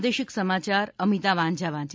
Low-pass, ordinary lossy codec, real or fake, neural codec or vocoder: none; none; real; none